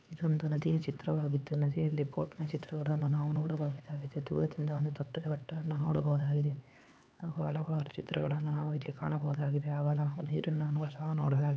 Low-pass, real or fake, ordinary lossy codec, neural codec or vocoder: none; fake; none; codec, 16 kHz, 4 kbps, X-Codec, HuBERT features, trained on LibriSpeech